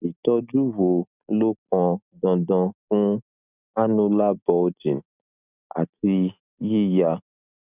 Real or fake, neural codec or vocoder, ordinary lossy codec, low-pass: real; none; none; 3.6 kHz